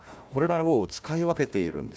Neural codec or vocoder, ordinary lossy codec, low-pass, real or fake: codec, 16 kHz, 1 kbps, FunCodec, trained on Chinese and English, 50 frames a second; none; none; fake